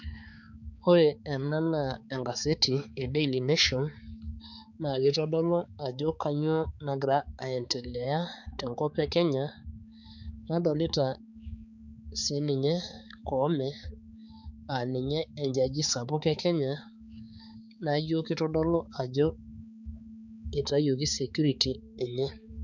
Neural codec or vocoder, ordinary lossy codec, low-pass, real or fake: codec, 16 kHz, 4 kbps, X-Codec, HuBERT features, trained on balanced general audio; none; 7.2 kHz; fake